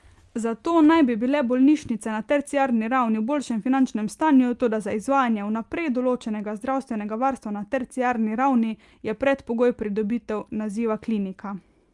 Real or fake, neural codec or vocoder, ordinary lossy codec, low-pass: real; none; Opus, 32 kbps; 10.8 kHz